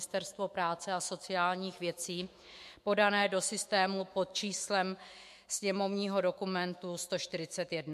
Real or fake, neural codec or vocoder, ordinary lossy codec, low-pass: fake; autoencoder, 48 kHz, 128 numbers a frame, DAC-VAE, trained on Japanese speech; MP3, 64 kbps; 14.4 kHz